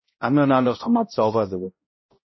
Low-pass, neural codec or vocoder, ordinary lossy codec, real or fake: 7.2 kHz; codec, 16 kHz, 0.5 kbps, X-Codec, HuBERT features, trained on balanced general audio; MP3, 24 kbps; fake